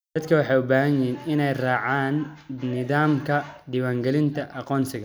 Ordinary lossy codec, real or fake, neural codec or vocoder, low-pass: none; real; none; none